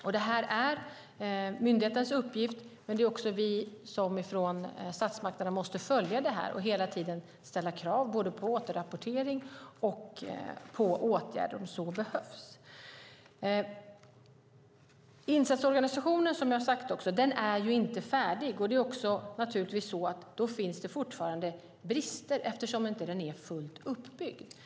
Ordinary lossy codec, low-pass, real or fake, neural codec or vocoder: none; none; real; none